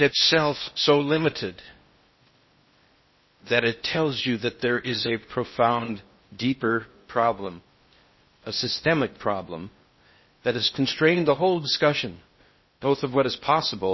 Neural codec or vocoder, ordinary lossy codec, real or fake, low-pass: codec, 16 kHz in and 24 kHz out, 0.6 kbps, FocalCodec, streaming, 4096 codes; MP3, 24 kbps; fake; 7.2 kHz